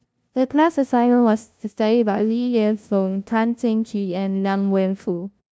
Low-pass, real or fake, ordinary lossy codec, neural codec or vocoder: none; fake; none; codec, 16 kHz, 0.5 kbps, FunCodec, trained on LibriTTS, 25 frames a second